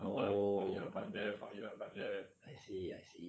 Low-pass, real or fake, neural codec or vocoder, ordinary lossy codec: none; fake; codec, 16 kHz, 8 kbps, FunCodec, trained on LibriTTS, 25 frames a second; none